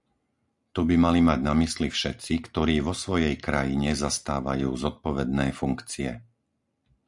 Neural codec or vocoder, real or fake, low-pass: none; real; 10.8 kHz